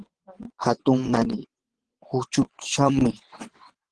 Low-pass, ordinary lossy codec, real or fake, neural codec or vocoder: 9.9 kHz; Opus, 16 kbps; fake; vocoder, 22.05 kHz, 80 mel bands, WaveNeXt